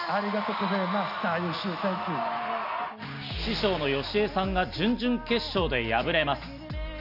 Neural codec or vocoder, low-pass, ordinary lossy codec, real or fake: none; 5.4 kHz; none; real